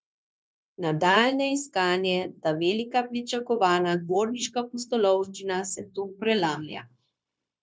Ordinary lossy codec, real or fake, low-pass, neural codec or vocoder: none; fake; none; codec, 16 kHz, 0.9 kbps, LongCat-Audio-Codec